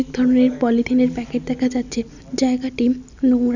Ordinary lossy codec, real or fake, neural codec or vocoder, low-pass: none; real; none; 7.2 kHz